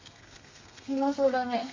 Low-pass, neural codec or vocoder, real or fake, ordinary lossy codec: 7.2 kHz; codec, 32 kHz, 1.9 kbps, SNAC; fake; MP3, 64 kbps